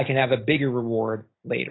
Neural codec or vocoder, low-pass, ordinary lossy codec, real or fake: none; 7.2 kHz; AAC, 16 kbps; real